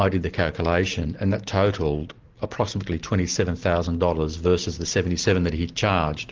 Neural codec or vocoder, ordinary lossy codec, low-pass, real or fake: none; Opus, 16 kbps; 7.2 kHz; real